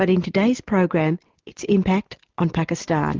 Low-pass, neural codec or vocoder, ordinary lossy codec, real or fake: 7.2 kHz; none; Opus, 16 kbps; real